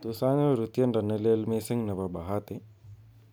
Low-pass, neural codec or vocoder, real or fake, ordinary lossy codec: none; none; real; none